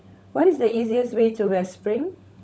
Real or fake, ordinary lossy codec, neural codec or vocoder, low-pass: fake; none; codec, 16 kHz, 16 kbps, FunCodec, trained on LibriTTS, 50 frames a second; none